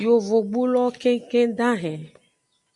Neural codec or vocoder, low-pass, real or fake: none; 10.8 kHz; real